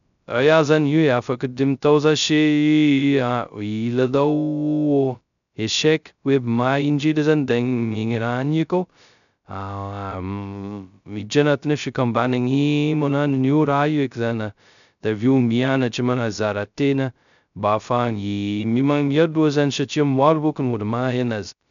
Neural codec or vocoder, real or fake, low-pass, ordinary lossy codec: codec, 16 kHz, 0.2 kbps, FocalCodec; fake; 7.2 kHz; none